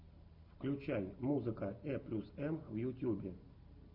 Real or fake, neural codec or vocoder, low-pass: real; none; 5.4 kHz